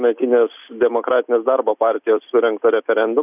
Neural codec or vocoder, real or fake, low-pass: none; real; 3.6 kHz